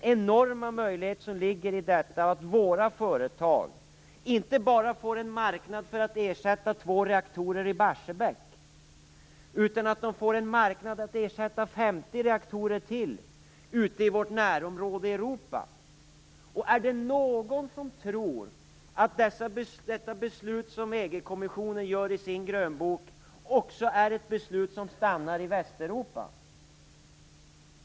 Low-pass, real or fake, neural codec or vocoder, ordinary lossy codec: none; real; none; none